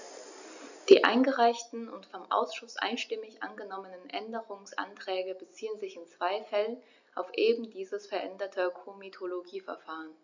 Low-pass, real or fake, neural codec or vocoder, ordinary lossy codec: 7.2 kHz; real; none; none